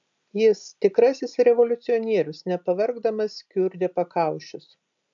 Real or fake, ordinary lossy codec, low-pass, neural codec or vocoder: real; AAC, 64 kbps; 7.2 kHz; none